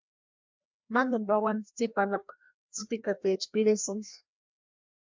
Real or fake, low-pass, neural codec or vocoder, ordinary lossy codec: fake; 7.2 kHz; codec, 16 kHz, 1 kbps, FreqCodec, larger model; MP3, 64 kbps